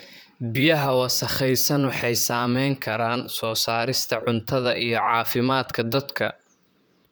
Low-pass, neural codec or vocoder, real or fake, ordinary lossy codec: none; vocoder, 44.1 kHz, 128 mel bands, Pupu-Vocoder; fake; none